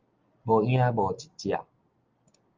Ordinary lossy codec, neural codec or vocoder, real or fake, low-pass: Opus, 32 kbps; none; real; 7.2 kHz